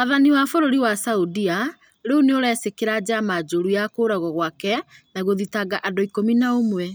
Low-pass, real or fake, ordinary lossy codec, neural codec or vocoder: none; real; none; none